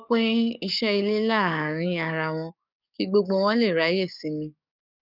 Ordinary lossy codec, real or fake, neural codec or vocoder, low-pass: none; fake; codec, 44.1 kHz, 7.8 kbps, DAC; 5.4 kHz